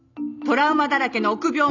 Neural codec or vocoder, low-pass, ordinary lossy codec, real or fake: none; 7.2 kHz; none; real